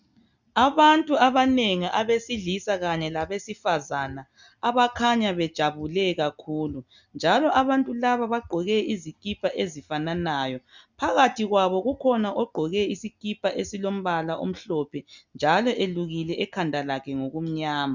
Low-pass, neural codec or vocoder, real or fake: 7.2 kHz; none; real